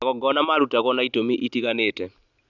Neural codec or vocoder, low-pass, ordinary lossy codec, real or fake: none; 7.2 kHz; none; real